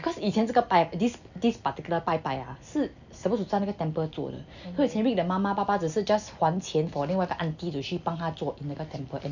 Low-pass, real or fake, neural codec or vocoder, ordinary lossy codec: 7.2 kHz; real; none; none